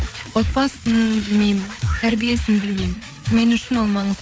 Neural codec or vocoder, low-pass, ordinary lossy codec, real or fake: codec, 16 kHz, 4 kbps, FreqCodec, larger model; none; none; fake